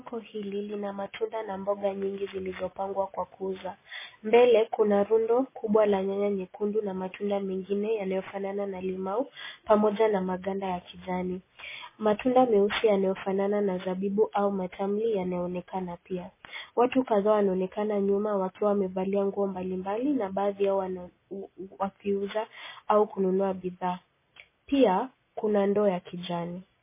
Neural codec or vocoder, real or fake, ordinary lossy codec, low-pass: none; real; MP3, 16 kbps; 3.6 kHz